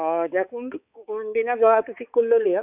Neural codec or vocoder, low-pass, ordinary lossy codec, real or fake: codec, 16 kHz, 4 kbps, X-Codec, HuBERT features, trained on balanced general audio; 3.6 kHz; AAC, 32 kbps; fake